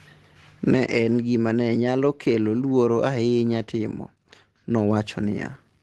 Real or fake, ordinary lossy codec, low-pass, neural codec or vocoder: real; Opus, 16 kbps; 10.8 kHz; none